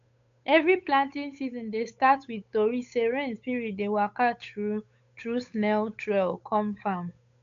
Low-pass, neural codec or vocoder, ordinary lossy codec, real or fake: 7.2 kHz; codec, 16 kHz, 8 kbps, FunCodec, trained on Chinese and English, 25 frames a second; AAC, 64 kbps; fake